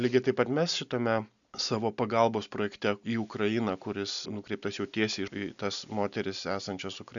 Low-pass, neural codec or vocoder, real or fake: 7.2 kHz; none; real